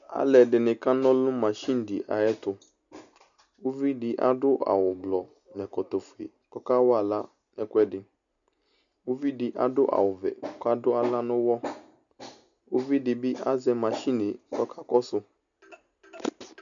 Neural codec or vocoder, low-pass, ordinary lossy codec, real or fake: none; 7.2 kHz; MP3, 96 kbps; real